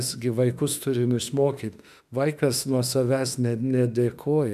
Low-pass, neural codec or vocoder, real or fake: 14.4 kHz; autoencoder, 48 kHz, 32 numbers a frame, DAC-VAE, trained on Japanese speech; fake